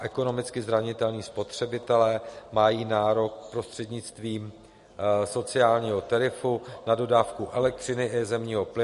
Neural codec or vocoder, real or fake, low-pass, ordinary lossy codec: none; real; 14.4 kHz; MP3, 48 kbps